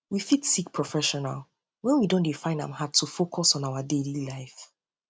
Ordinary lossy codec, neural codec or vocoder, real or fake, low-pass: none; none; real; none